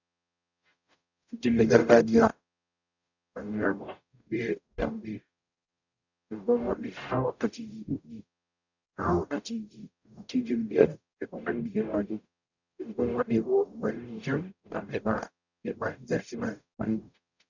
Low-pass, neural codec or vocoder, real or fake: 7.2 kHz; codec, 44.1 kHz, 0.9 kbps, DAC; fake